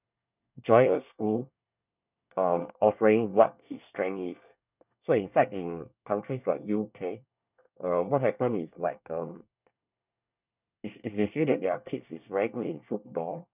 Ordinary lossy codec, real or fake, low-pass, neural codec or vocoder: none; fake; 3.6 kHz; codec, 24 kHz, 1 kbps, SNAC